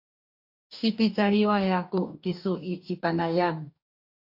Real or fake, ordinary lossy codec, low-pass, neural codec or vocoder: fake; AAC, 48 kbps; 5.4 kHz; codec, 44.1 kHz, 2.6 kbps, DAC